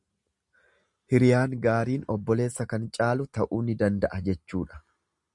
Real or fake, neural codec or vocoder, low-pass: real; none; 10.8 kHz